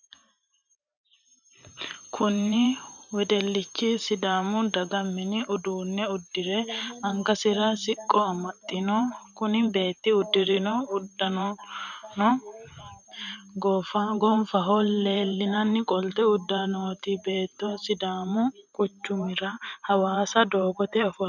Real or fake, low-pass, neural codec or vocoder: fake; 7.2 kHz; vocoder, 44.1 kHz, 128 mel bands every 512 samples, BigVGAN v2